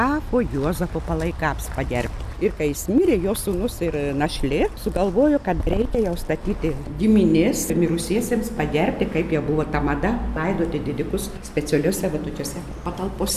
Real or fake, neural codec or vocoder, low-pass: real; none; 14.4 kHz